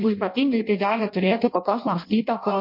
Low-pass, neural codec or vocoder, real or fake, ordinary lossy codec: 5.4 kHz; codec, 16 kHz in and 24 kHz out, 0.6 kbps, FireRedTTS-2 codec; fake; MP3, 32 kbps